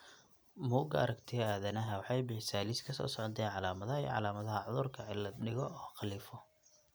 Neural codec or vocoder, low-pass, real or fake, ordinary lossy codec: none; none; real; none